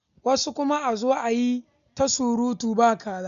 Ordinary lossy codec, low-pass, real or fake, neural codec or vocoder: none; 7.2 kHz; real; none